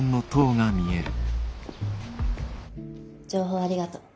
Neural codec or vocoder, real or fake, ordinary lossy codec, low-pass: none; real; none; none